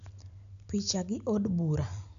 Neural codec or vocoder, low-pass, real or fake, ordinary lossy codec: none; 7.2 kHz; real; none